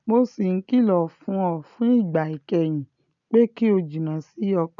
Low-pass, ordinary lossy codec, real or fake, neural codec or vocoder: 7.2 kHz; none; real; none